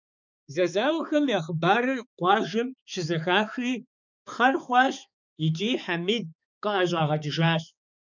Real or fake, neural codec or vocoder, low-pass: fake; codec, 16 kHz, 4 kbps, X-Codec, HuBERT features, trained on balanced general audio; 7.2 kHz